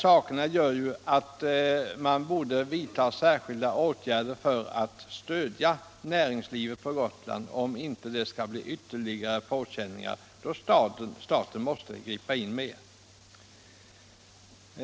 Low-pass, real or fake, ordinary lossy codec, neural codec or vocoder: none; real; none; none